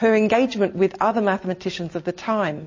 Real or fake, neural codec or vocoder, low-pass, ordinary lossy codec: real; none; 7.2 kHz; MP3, 32 kbps